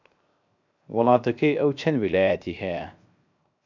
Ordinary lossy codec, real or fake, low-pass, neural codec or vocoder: MP3, 64 kbps; fake; 7.2 kHz; codec, 16 kHz, 0.7 kbps, FocalCodec